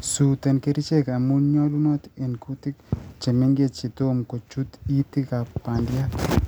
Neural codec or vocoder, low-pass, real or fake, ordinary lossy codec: none; none; real; none